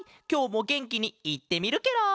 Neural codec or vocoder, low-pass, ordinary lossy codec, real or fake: none; none; none; real